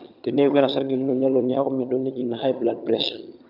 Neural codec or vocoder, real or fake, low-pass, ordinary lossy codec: vocoder, 22.05 kHz, 80 mel bands, HiFi-GAN; fake; 5.4 kHz; none